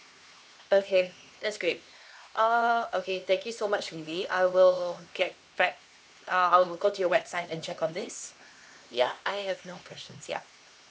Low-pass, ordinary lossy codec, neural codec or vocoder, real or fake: none; none; codec, 16 kHz, 2 kbps, X-Codec, HuBERT features, trained on LibriSpeech; fake